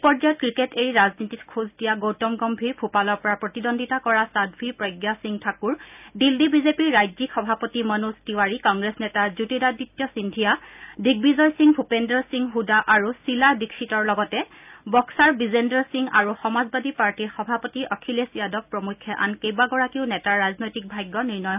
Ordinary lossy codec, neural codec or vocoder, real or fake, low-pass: none; none; real; 3.6 kHz